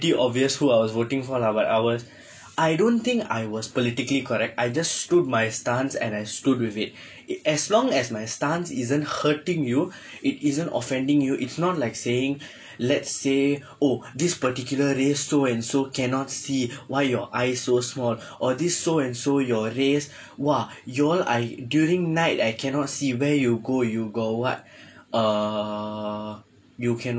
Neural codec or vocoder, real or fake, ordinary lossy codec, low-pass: none; real; none; none